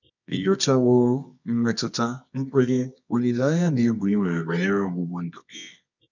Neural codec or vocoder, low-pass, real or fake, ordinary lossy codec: codec, 24 kHz, 0.9 kbps, WavTokenizer, medium music audio release; 7.2 kHz; fake; none